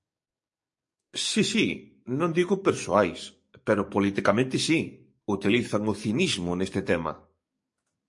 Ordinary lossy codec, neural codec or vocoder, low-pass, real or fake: MP3, 48 kbps; codec, 44.1 kHz, 7.8 kbps, DAC; 10.8 kHz; fake